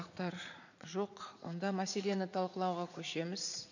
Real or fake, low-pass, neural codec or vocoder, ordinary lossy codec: fake; 7.2 kHz; vocoder, 44.1 kHz, 80 mel bands, Vocos; none